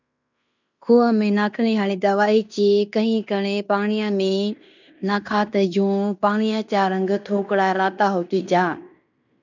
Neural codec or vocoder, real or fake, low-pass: codec, 16 kHz in and 24 kHz out, 0.9 kbps, LongCat-Audio-Codec, fine tuned four codebook decoder; fake; 7.2 kHz